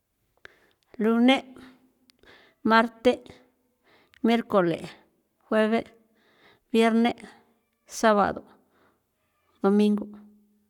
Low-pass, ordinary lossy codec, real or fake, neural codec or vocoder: 19.8 kHz; none; fake; codec, 44.1 kHz, 7.8 kbps, Pupu-Codec